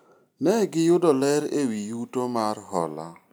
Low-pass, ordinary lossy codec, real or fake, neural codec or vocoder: none; none; real; none